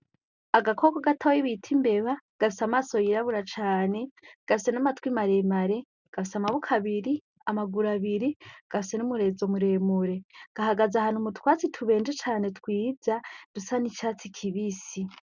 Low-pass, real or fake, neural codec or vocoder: 7.2 kHz; real; none